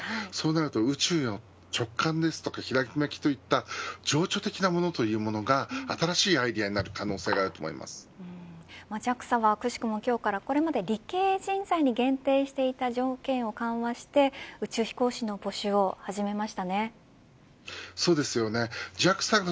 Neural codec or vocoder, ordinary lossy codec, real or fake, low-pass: none; none; real; none